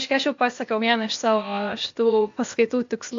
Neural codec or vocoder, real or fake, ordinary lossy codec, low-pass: codec, 16 kHz, 0.8 kbps, ZipCodec; fake; AAC, 48 kbps; 7.2 kHz